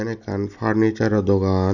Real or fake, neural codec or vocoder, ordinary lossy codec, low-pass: real; none; none; 7.2 kHz